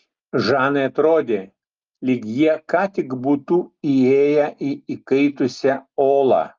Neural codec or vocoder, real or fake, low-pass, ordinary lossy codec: none; real; 7.2 kHz; Opus, 24 kbps